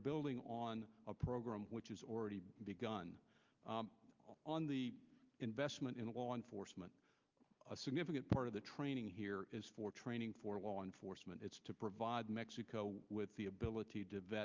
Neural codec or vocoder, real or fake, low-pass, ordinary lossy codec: none; real; 7.2 kHz; Opus, 24 kbps